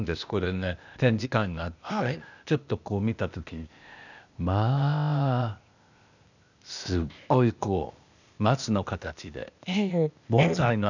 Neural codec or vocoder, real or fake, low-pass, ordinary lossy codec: codec, 16 kHz, 0.8 kbps, ZipCodec; fake; 7.2 kHz; none